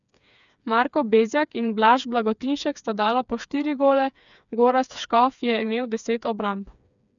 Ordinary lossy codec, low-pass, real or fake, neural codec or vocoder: none; 7.2 kHz; fake; codec, 16 kHz, 4 kbps, FreqCodec, smaller model